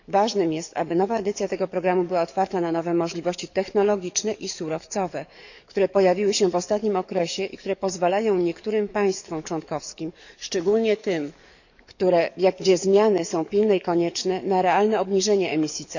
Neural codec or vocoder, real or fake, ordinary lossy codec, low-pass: codec, 44.1 kHz, 7.8 kbps, DAC; fake; none; 7.2 kHz